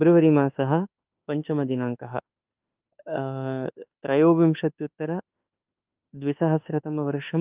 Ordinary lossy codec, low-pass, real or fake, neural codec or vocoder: Opus, 24 kbps; 3.6 kHz; fake; autoencoder, 48 kHz, 32 numbers a frame, DAC-VAE, trained on Japanese speech